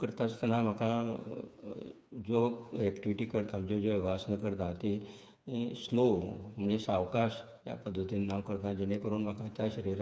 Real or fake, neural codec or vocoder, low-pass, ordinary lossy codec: fake; codec, 16 kHz, 4 kbps, FreqCodec, smaller model; none; none